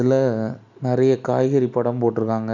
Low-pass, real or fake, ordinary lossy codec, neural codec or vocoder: 7.2 kHz; real; none; none